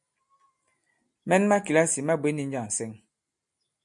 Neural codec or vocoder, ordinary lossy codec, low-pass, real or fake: none; MP3, 64 kbps; 10.8 kHz; real